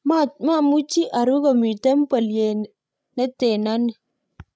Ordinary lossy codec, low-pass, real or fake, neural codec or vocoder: none; none; fake; codec, 16 kHz, 16 kbps, FreqCodec, larger model